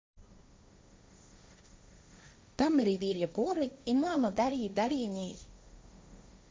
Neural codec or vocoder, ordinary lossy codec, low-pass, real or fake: codec, 16 kHz, 1.1 kbps, Voila-Tokenizer; none; none; fake